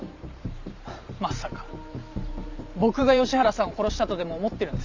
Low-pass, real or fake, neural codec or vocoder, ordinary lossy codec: 7.2 kHz; fake; vocoder, 44.1 kHz, 128 mel bands every 512 samples, BigVGAN v2; MP3, 64 kbps